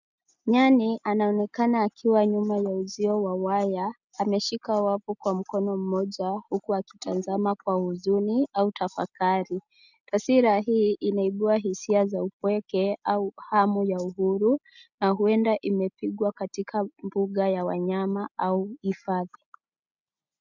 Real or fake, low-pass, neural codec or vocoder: real; 7.2 kHz; none